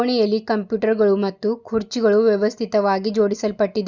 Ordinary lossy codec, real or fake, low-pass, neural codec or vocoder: Opus, 64 kbps; real; 7.2 kHz; none